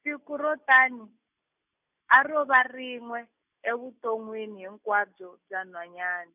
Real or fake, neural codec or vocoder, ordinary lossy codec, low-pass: real; none; none; 3.6 kHz